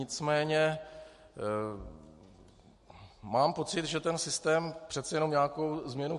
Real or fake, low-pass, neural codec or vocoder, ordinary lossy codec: real; 14.4 kHz; none; MP3, 48 kbps